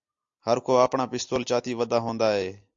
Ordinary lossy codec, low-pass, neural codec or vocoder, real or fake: AAC, 64 kbps; 7.2 kHz; none; real